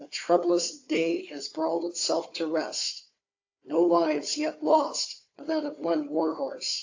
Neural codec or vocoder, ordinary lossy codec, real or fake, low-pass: codec, 16 kHz, 4 kbps, FunCodec, trained on Chinese and English, 50 frames a second; AAC, 48 kbps; fake; 7.2 kHz